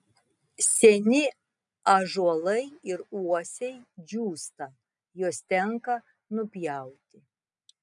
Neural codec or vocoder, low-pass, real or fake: none; 10.8 kHz; real